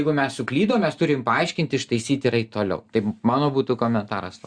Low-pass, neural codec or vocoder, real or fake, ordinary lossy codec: 9.9 kHz; none; real; Opus, 64 kbps